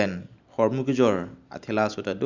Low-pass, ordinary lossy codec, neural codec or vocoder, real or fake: 7.2 kHz; Opus, 64 kbps; none; real